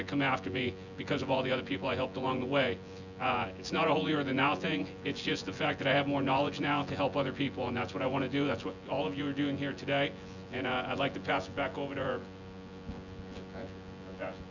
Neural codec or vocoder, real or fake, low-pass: vocoder, 24 kHz, 100 mel bands, Vocos; fake; 7.2 kHz